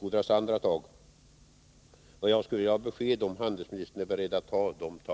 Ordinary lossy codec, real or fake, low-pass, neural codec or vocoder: none; real; none; none